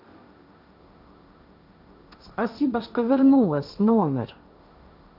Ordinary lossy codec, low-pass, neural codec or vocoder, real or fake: none; 5.4 kHz; codec, 16 kHz, 1.1 kbps, Voila-Tokenizer; fake